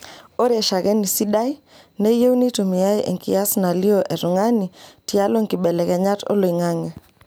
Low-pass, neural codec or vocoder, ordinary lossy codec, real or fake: none; none; none; real